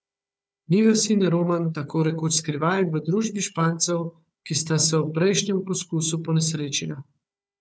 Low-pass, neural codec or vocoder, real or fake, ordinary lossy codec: none; codec, 16 kHz, 4 kbps, FunCodec, trained on Chinese and English, 50 frames a second; fake; none